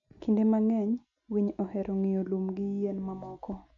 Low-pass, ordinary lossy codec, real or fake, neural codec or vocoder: 7.2 kHz; none; real; none